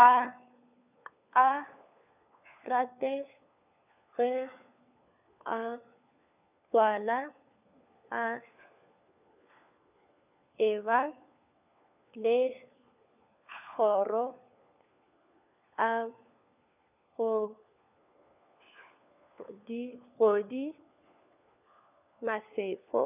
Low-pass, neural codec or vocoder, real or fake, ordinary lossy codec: 3.6 kHz; codec, 16 kHz, 4 kbps, FunCodec, trained on LibriTTS, 50 frames a second; fake; none